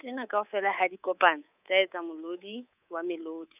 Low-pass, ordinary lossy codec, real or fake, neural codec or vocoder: 3.6 kHz; none; fake; codec, 24 kHz, 3.1 kbps, DualCodec